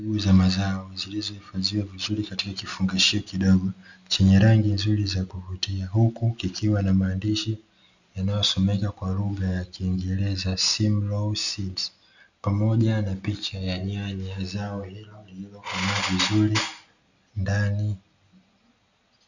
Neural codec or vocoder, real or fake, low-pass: none; real; 7.2 kHz